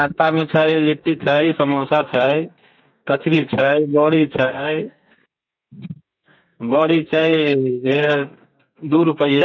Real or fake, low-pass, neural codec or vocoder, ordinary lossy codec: fake; 7.2 kHz; codec, 32 kHz, 1.9 kbps, SNAC; MP3, 48 kbps